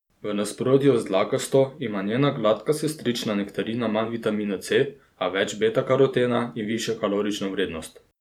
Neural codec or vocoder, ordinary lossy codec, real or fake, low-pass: vocoder, 44.1 kHz, 128 mel bands every 512 samples, BigVGAN v2; none; fake; 19.8 kHz